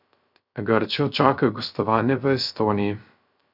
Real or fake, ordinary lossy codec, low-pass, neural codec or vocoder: fake; none; 5.4 kHz; codec, 16 kHz, 0.3 kbps, FocalCodec